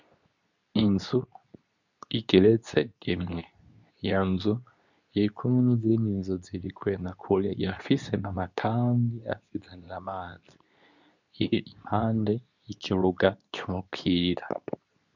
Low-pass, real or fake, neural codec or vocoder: 7.2 kHz; fake; codec, 24 kHz, 0.9 kbps, WavTokenizer, medium speech release version 2